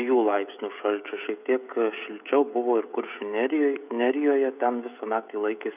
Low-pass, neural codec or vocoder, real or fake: 3.6 kHz; codec, 16 kHz, 16 kbps, FreqCodec, smaller model; fake